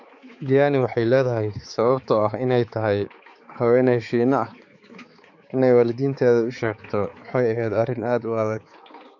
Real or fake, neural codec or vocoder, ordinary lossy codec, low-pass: fake; codec, 16 kHz, 4 kbps, X-Codec, HuBERT features, trained on balanced general audio; AAC, 48 kbps; 7.2 kHz